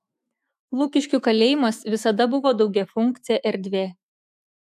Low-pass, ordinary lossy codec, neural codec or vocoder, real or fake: 14.4 kHz; AAC, 96 kbps; autoencoder, 48 kHz, 128 numbers a frame, DAC-VAE, trained on Japanese speech; fake